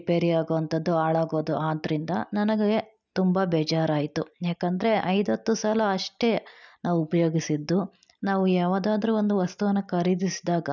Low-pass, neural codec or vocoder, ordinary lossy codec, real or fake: 7.2 kHz; none; none; real